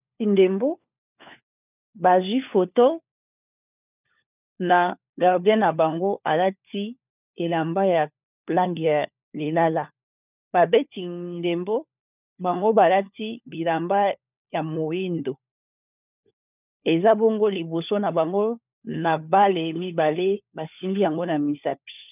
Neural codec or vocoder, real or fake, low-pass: codec, 16 kHz, 4 kbps, FunCodec, trained on LibriTTS, 50 frames a second; fake; 3.6 kHz